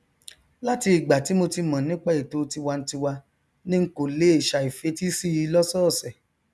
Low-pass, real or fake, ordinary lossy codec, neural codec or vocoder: none; real; none; none